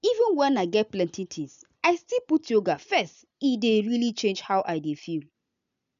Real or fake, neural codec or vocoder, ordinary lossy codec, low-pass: real; none; none; 7.2 kHz